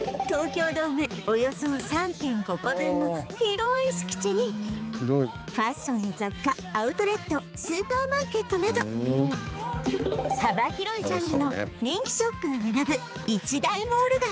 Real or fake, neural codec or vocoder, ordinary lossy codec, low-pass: fake; codec, 16 kHz, 4 kbps, X-Codec, HuBERT features, trained on balanced general audio; none; none